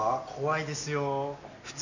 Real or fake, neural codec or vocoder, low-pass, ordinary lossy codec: real; none; 7.2 kHz; none